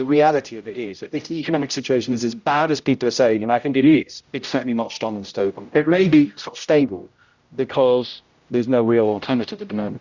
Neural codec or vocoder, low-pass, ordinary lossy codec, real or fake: codec, 16 kHz, 0.5 kbps, X-Codec, HuBERT features, trained on general audio; 7.2 kHz; Opus, 64 kbps; fake